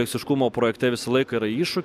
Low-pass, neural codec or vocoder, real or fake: 14.4 kHz; none; real